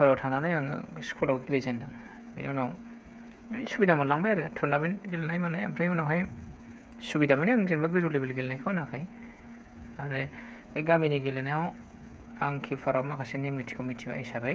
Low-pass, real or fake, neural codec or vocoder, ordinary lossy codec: none; fake; codec, 16 kHz, 8 kbps, FreqCodec, smaller model; none